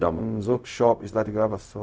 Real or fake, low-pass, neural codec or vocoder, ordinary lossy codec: fake; none; codec, 16 kHz, 0.4 kbps, LongCat-Audio-Codec; none